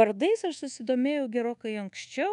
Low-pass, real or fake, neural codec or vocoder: 10.8 kHz; fake; codec, 24 kHz, 1.2 kbps, DualCodec